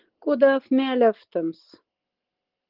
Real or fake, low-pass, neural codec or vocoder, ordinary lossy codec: real; 5.4 kHz; none; Opus, 16 kbps